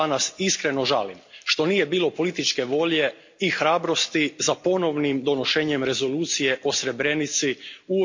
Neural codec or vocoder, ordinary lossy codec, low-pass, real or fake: none; MP3, 48 kbps; 7.2 kHz; real